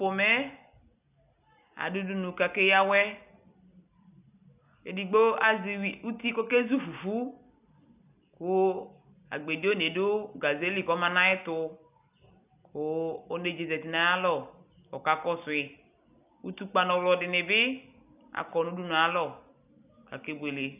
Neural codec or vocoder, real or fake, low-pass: none; real; 3.6 kHz